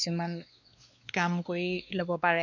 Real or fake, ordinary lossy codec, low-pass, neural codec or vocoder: fake; none; 7.2 kHz; codec, 16 kHz, 2 kbps, X-Codec, WavLM features, trained on Multilingual LibriSpeech